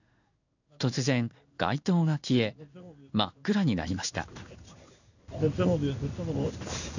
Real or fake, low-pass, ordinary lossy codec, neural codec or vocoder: fake; 7.2 kHz; none; codec, 16 kHz in and 24 kHz out, 1 kbps, XY-Tokenizer